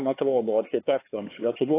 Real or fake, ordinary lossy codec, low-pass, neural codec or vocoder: fake; AAC, 16 kbps; 3.6 kHz; codec, 16 kHz, 4 kbps, X-Codec, HuBERT features, trained on LibriSpeech